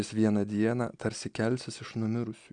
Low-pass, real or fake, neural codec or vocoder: 9.9 kHz; real; none